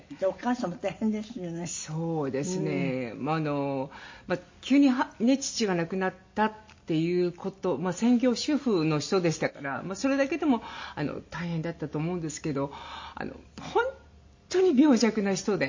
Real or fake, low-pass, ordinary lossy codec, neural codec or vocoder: real; 7.2 kHz; MP3, 32 kbps; none